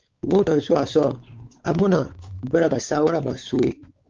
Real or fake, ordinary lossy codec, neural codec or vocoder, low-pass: fake; Opus, 24 kbps; codec, 16 kHz, 4 kbps, X-Codec, WavLM features, trained on Multilingual LibriSpeech; 7.2 kHz